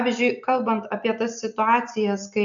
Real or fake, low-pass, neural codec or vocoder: real; 7.2 kHz; none